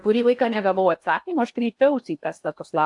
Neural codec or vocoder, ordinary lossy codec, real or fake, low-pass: codec, 16 kHz in and 24 kHz out, 0.8 kbps, FocalCodec, streaming, 65536 codes; AAC, 64 kbps; fake; 10.8 kHz